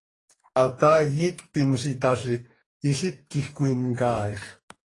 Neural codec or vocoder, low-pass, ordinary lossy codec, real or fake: codec, 44.1 kHz, 2.6 kbps, DAC; 10.8 kHz; AAC, 32 kbps; fake